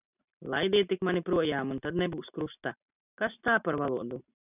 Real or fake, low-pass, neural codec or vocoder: real; 3.6 kHz; none